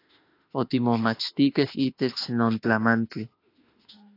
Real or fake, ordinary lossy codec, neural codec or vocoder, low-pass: fake; AAC, 32 kbps; autoencoder, 48 kHz, 32 numbers a frame, DAC-VAE, trained on Japanese speech; 5.4 kHz